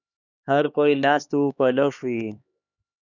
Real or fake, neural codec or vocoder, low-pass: fake; codec, 16 kHz, 2 kbps, X-Codec, HuBERT features, trained on LibriSpeech; 7.2 kHz